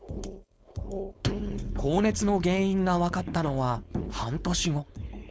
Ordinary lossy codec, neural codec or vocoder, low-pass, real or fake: none; codec, 16 kHz, 4.8 kbps, FACodec; none; fake